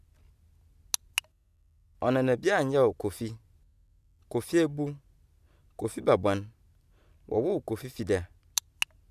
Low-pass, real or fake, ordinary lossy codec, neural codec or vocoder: 14.4 kHz; real; none; none